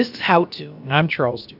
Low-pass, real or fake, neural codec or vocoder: 5.4 kHz; fake; codec, 16 kHz, about 1 kbps, DyCAST, with the encoder's durations